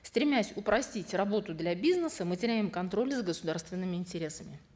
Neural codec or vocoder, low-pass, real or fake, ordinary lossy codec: none; none; real; none